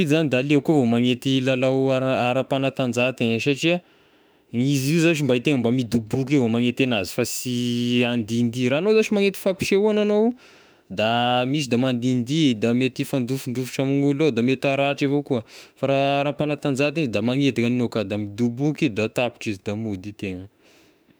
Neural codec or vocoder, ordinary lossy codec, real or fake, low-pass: autoencoder, 48 kHz, 32 numbers a frame, DAC-VAE, trained on Japanese speech; none; fake; none